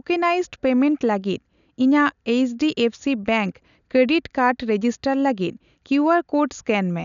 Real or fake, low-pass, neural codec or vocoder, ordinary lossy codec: real; 7.2 kHz; none; none